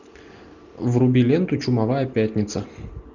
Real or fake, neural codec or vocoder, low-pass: real; none; 7.2 kHz